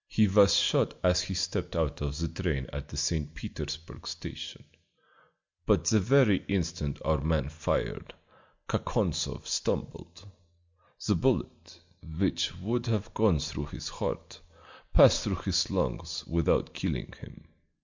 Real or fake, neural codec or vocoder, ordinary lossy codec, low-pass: real; none; MP3, 64 kbps; 7.2 kHz